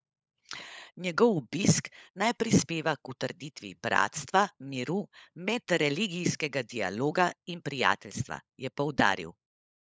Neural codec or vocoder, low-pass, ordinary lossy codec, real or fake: codec, 16 kHz, 16 kbps, FunCodec, trained on LibriTTS, 50 frames a second; none; none; fake